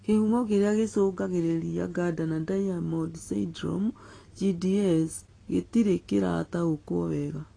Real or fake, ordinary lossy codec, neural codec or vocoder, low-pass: real; AAC, 32 kbps; none; 9.9 kHz